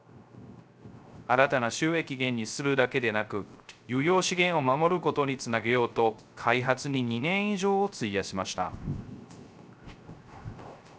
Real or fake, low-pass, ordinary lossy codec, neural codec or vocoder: fake; none; none; codec, 16 kHz, 0.3 kbps, FocalCodec